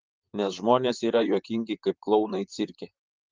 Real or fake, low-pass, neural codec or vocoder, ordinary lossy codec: fake; 7.2 kHz; vocoder, 44.1 kHz, 128 mel bands, Pupu-Vocoder; Opus, 32 kbps